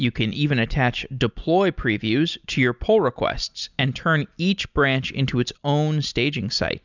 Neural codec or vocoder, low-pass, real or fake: none; 7.2 kHz; real